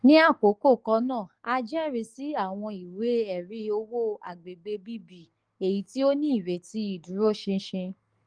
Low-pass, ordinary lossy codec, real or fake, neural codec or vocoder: 9.9 kHz; Opus, 24 kbps; fake; codec, 44.1 kHz, 7.8 kbps, DAC